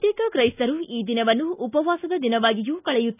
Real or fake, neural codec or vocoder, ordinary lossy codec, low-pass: real; none; none; 3.6 kHz